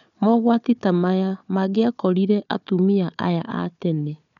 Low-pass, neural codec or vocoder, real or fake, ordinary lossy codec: 7.2 kHz; codec, 16 kHz, 4 kbps, FunCodec, trained on Chinese and English, 50 frames a second; fake; none